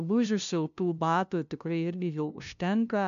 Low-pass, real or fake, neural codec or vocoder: 7.2 kHz; fake; codec, 16 kHz, 0.5 kbps, FunCodec, trained on LibriTTS, 25 frames a second